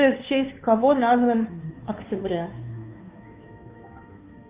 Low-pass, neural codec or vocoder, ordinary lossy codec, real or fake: 3.6 kHz; codec, 16 kHz, 2 kbps, FunCodec, trained on Chinese and English, 25 frames a second; Opus, 64 kbps; fake